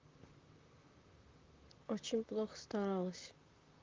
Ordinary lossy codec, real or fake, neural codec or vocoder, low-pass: Opus, 24 kbps; fake; vocoder, 44.1 kHz, 128 mel bands, Pupu-Vocoder; 7.2 kHz